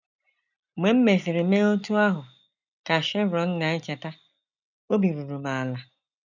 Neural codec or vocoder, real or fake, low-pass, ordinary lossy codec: none; real; 7.2 kHz; none